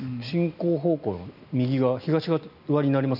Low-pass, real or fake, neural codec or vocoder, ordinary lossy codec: 5.4 kHz; real; none; none